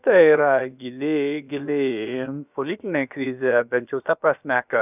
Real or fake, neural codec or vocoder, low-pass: fake; codec, 16 kHz, about 1 kbps, DyCAST, with the encoder's durations; 3.6 kHz